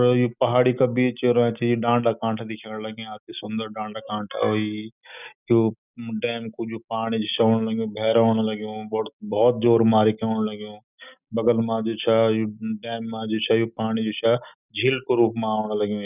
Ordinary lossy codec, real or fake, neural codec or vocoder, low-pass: none; real; none; 3.6 kHz